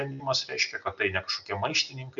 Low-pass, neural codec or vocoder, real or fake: 7.2 kHz; none; real